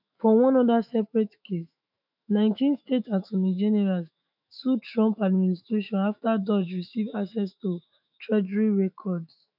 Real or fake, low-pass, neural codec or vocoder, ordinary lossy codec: fake; 5.4 kHz; autoencoder, 48 kHz, 128 numbers a frame, DAC-VAE, trained on Japanese speech; none